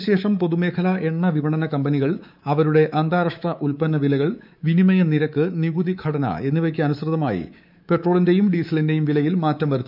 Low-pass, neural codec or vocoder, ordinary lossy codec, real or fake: 5.4 kHz; codec, 24 kHz, 3.1 kbps, DualCodec; none; fake